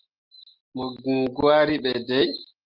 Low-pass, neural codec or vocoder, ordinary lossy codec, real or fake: 5.4 kHz; none; Opus, 24 kbps; real